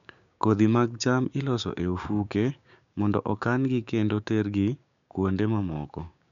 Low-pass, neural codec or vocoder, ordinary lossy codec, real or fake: 7.2 kHz; codec, 16 kHz, 6 kbps, DAC; none; fake